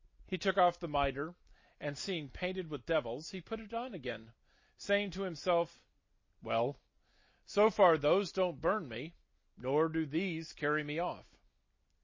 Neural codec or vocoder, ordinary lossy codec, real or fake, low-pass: none; MP3, 32 kbps; real; 7.2 kHz